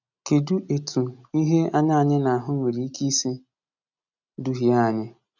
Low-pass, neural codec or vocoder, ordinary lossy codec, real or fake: 7.2 kHz; none; none; real